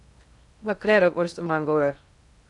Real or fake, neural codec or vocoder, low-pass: fake; codec, 16 kHz in and 24 kHz out, 0.6 kbps, FocalCodec, streaming, 2048 codes; 10.8 kHz